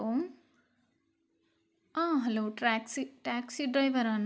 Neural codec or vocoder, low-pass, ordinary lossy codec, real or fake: none; none; none; real